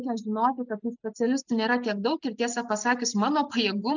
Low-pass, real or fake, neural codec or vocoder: 7.2 kHz; real; none